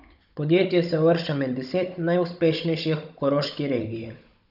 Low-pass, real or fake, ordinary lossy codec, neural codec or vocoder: 5.4 kHz; fake; none; codec, 16 kHz, 16 kbps, FunCodec, trained on Chinese and English, 50 frames a second